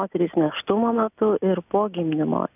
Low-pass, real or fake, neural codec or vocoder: 3.6 kHz; real; none